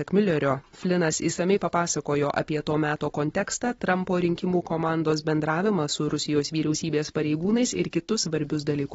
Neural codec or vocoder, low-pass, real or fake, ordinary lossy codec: none; 19.8 kHz; real; AAC, 24 kbps